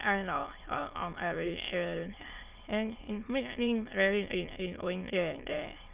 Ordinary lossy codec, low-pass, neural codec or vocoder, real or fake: Opus, 32 kbps; 3.6 kHz; autoencoder, 22.05 kHz, a latent of 192 numbers a frame, VITS, trained on many speakers; fake